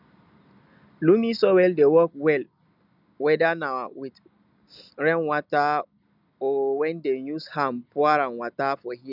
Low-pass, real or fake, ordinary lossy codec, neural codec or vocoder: 5.4 kHz; real; none; none